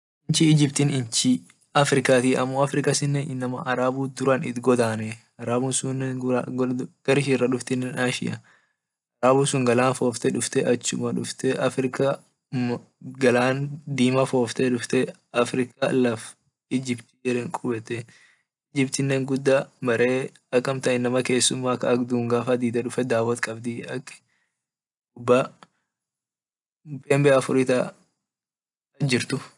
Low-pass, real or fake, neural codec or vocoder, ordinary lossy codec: 10.8 kHz; real; none; none